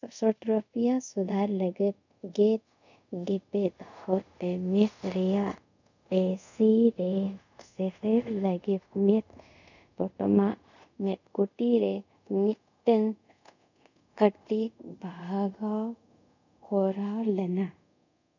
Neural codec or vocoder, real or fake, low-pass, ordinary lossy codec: codec, 24 kHz, 0.5 kbps, DualCodec; fake; 7.2 kHz; none